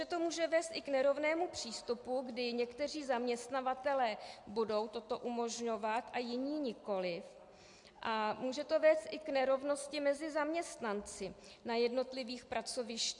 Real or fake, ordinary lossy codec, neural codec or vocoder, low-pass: real; MP3, 64 kbps; none; 10.8 kHz